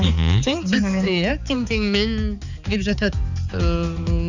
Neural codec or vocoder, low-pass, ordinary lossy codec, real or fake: codec, 16 kHz, 4 kbps, X-Codec, HuBERT features, trained on balanced general audio; 7.2 kHz; none; fake